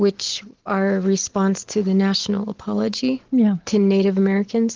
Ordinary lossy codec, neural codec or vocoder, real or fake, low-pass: Opus, 16 kbps; none; real; 7.2 kHz